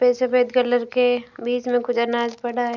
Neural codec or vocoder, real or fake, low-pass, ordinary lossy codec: none; real; 7.2 kHz; none